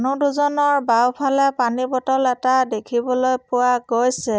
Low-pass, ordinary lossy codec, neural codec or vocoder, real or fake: none; none; none; real